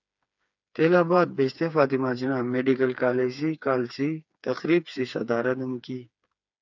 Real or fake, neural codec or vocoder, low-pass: fake; codec, 16 kHz, 4 kbps, FreqCodec, smaller model; 7.2 kHz